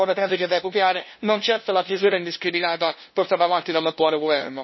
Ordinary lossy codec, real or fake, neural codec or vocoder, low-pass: MP3, 24 kbps; fake; codec, 16 kHz, 0.5 kbps, FunCodec, trained on LibriTTS, 25 frames a second; 7.2 kHz